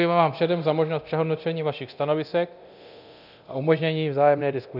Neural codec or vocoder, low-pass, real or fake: codec, 24 kHz, 0.9 kbps, DualCodec; 5.4 kHz; fake